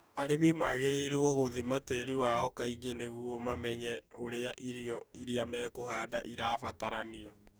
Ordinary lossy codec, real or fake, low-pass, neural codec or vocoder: none; fake; none; codec, 44.1 kHz, 2.6 kbps, DAC